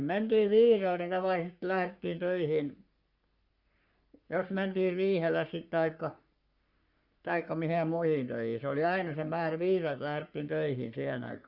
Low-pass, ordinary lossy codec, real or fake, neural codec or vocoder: 5.4 kHz; Opus, 64 kbps; fake; codec, 44.1 kHz, 3.4 kbps, Pupu-Codec